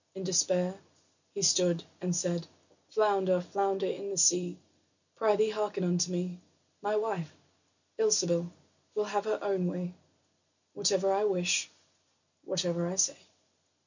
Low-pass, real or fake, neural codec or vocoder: 7.2 kHz; real; none